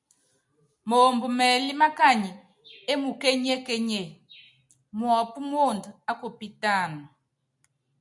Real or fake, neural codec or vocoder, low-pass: real; none; 10.8 kHz